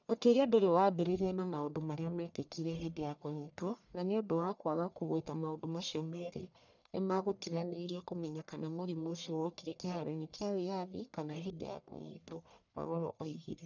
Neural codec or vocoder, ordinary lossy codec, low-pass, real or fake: codec, 44.1 kHz, 1.7 kbps, Pupu-Codec; none; 7.2 kHz; fake